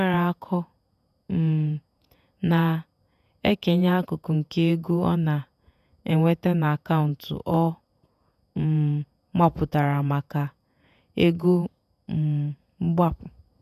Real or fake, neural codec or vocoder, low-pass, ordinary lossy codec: fake; vocoder, 48 kHz, 128 mel bands, Vocos; 14.4 kHz; none